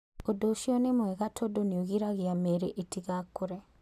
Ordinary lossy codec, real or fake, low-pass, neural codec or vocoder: none; real; 14.4 kHz; none